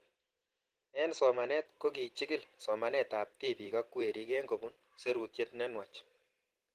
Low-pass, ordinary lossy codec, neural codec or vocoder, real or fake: 14.4 kHz; Opus, 16 kbps; vocoder, 44.1 kHz, 128 mel bands every 512 samples, BigVGAN v2; fake